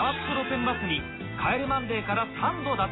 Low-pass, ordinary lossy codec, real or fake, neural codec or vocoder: 7.2 kHz; AAC, 16 kbps; real; none